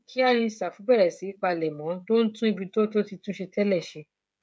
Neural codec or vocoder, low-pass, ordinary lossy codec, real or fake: codec, 16 kHz, 16 kbps, FreqCodec, smaller model; none; none; fake